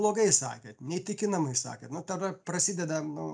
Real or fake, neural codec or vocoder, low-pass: real; none; 9.9 kHz